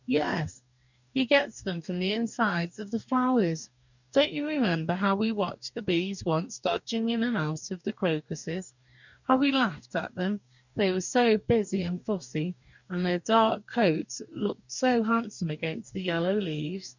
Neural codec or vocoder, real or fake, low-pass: codec, 44.1 kHz, 2.6 kbps, DAC; fake; 7.2 kHz